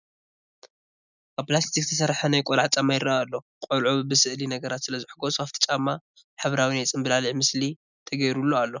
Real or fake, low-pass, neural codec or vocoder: real; 7.2 kHz; none